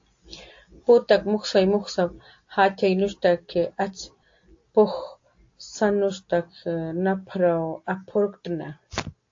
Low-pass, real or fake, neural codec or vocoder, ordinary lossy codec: 7.2 kHz; real; none; AAC, 48 kbps